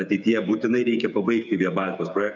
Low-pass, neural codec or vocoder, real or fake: 7.2 kHz; codec, 16 kHz, 8 kbps, FreqCodec, smaller model; fake